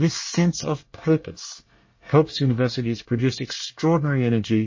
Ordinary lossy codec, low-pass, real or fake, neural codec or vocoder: MP3, 32 kbps; 7.2 kHz; fake; codec, 24 kHz, 1 kbps, SNAC